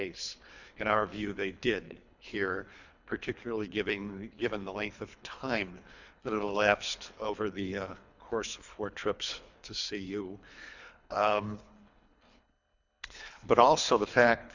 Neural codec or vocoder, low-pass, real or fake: codec, 24 kHz, 3 kbps, HILCodec; 7.2 kHz; fake